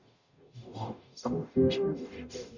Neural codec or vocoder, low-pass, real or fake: codec, 44.1 kHz, 0.9 kbps, DAC; 7.2 kHz; fake